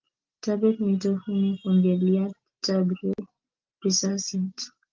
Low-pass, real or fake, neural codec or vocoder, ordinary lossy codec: 7.2 kHz; real; none; Opus, 32 kbps